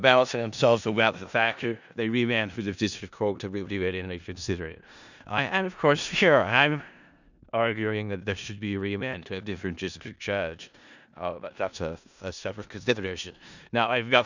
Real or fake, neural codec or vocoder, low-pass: fake; codec, 16 kHz in and 24 kHz out, 0.4 kbps, LongCat-Audio-Codec, four codebook decoder; 7.2 kHz